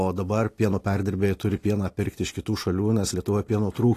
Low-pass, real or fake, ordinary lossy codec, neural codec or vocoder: 14.4 kHz; real; AAC, 48 kbps; none